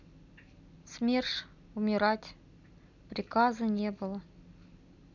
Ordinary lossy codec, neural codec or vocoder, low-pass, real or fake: none; none; 7.2 kHz; real